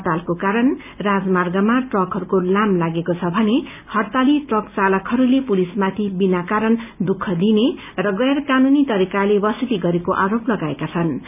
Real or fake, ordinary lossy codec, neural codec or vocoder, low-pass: real; none; none; 3.6 kHz